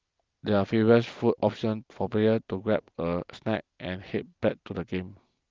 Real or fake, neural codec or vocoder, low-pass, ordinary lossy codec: real; none; 7.2 kHz; Opus, 32 kbps